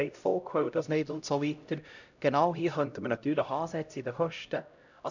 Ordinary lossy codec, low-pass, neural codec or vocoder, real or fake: none; 7.2 kHz; codec, 16 kHz, 0.5 kbps, X-Codec, HuBERT features, trained on LibriSpeech; fake